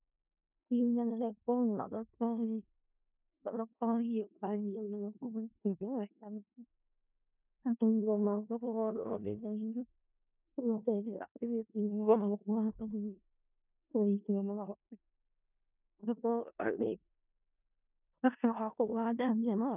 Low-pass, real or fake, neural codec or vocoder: 3.6 kHz; fake; codec, 16 kHz in and 24 kHz out, 0.4 kbps, LongCat-Audio-Codec, four codebook decoder